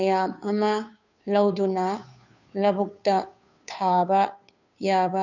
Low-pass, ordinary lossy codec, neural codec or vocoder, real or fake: 7.2 kHz; none; codec, 16 kHz, 2 kbps, FunCodec, trained on Chinese and English, 25 frames a second; fake